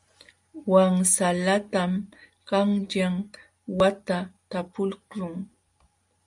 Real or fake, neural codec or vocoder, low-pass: real; none; 10.8 kHz